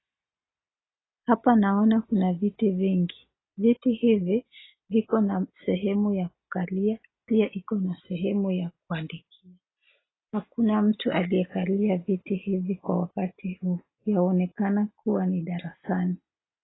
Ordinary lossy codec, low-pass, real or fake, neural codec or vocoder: AAC, 16 kbps; 7.2 kHz; real; none